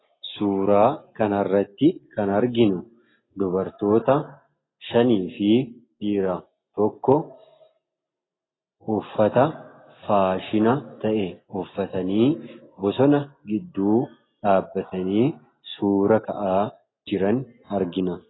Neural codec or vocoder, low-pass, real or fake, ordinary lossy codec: none; 7.2 kHz; real; AAC, 16 kbps